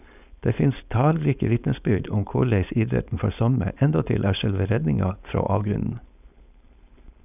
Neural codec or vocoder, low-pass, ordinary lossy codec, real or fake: codec, 16 kHz, 4.8 kbps, FACodec; 3.6 kHz; none; fake